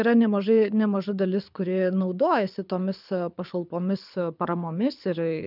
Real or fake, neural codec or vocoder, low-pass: fake; codec, 24 kHz, 6 kbps, HILCodec; 5.4 kHz